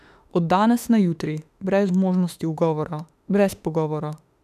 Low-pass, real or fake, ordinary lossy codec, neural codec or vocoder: 14.4 kHz; fake; none; autoencoder, 48 kHz, 32 numbers a frame, DAC-VAE, trained on Japanese speech